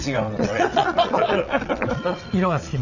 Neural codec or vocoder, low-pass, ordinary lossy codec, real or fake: vocoder, 22.05 kHz, 80 mel bands, WaveNeXt; 7.2 kHz; none; fake